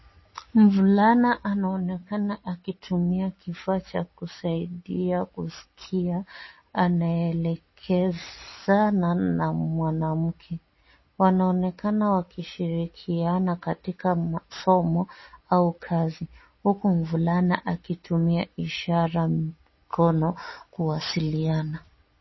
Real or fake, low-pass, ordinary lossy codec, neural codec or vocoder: real; 7.2 kHz; MP3, 24 kbps; none